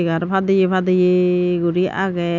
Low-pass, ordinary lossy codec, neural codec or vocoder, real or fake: 7.2 kHz; none; none; real